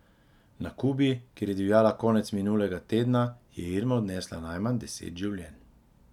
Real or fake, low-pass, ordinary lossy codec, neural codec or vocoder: real; 19.8 kHz; none; none